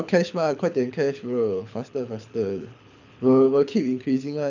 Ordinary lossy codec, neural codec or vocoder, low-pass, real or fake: none; codec, 24 kHz, 6 kbps, HILCodec; 7.2 kHz; fake